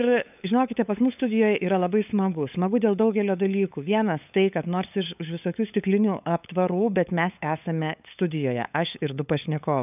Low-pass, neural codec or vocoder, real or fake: 3.6 kHz; codec, 16 kHz, 8 kbps, FunCodec, trained on LibriTTS, 25 frames a second; fake